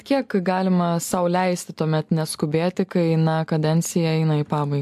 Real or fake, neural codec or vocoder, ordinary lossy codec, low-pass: real; none; AAC, 96 kbps; 14.4 kHz